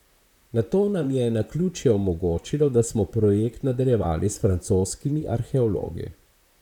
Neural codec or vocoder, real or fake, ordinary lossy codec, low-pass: vocoder, 44.1 kHz, 128 mel bands, Pupu-Vocoder; fake; none; 19.8 kHz